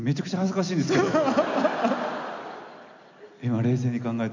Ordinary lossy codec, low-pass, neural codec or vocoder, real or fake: none; 7.2 kHz; none; real